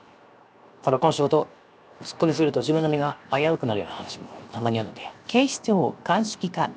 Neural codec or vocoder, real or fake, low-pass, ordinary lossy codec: codec, 16 kHz, 0.7 kbps, FocalCodec; fake; none; none